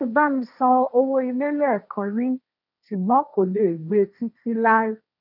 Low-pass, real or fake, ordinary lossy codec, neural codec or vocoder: 5.4 kHz; fake; none; codec, 16 kHz, 1.1 kbps, Voila-Tokenizer